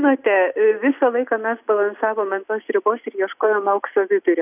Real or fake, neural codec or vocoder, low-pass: real; none; 3.6 kHz